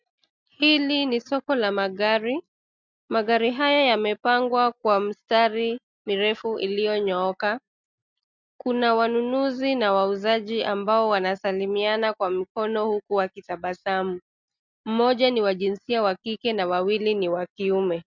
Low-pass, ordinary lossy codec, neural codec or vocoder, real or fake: 7.2 kHz; MP3, 64 kbps; none; real